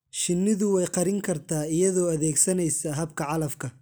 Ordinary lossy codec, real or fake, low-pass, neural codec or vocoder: none; real; none; none